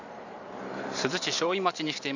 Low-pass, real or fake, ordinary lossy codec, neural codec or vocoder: 7.2 kHz; fake; none; vocoder, 44.1 kHz, 128 mel bands every 512 samples, BigVGAN v2